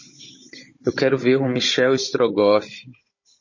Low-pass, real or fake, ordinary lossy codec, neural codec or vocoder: 7.2 kHz; fake; MP3, 32 kbps; codec, 16 kHz, 16 kbps, FunCodec, trained on Chinese and English, 50 frames a second